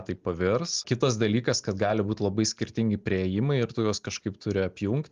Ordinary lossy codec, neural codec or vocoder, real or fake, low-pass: Opus, 32 kbps; none; real; 7.2 kHz